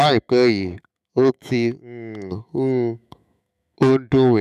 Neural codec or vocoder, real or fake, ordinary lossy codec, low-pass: autoencoder, 48 kHz, 128 numbers a frame, DAC-VAE, trained on Japanese speech; fake; none; 14.4 kHz